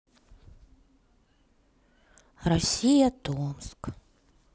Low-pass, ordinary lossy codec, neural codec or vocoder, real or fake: none; none; none; real